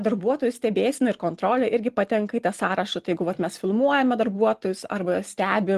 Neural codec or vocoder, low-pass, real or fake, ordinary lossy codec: none; 14.4 kHz; real; Opus, 24 kbps